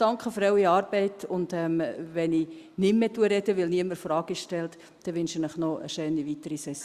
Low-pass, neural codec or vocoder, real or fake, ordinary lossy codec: 14.4 kHz; none; real; Opus, 64 kbps